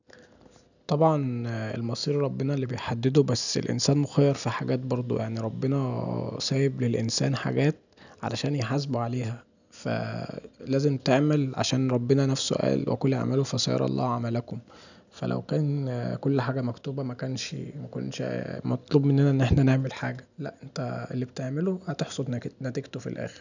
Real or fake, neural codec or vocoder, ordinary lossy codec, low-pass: real; none; none; 7.2 kHz